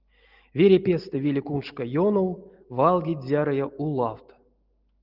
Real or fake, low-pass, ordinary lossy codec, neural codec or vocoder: real; 5.4 kHz; Opus, 32 kbps; none